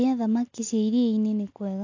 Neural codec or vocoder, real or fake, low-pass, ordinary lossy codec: none; real; 7.2 kHz; AAC, 48 kbps